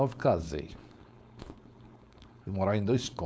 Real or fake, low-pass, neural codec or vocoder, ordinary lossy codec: fake; none; codec, 16 kHz, 4.8 kbps, FACodec; none